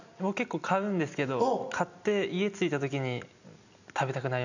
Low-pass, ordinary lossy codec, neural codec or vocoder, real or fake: 7.2 kHz; none; none; real